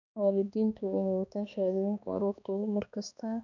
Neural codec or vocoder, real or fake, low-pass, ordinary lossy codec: codec, 16 kHz, 2 kbps, X-Codec, HuBERT features, trained on balanced general audio; fake; 7.2 kHz; none